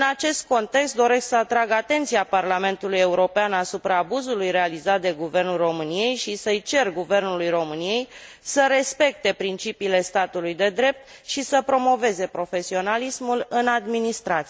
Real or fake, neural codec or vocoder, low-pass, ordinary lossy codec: real; none; none; none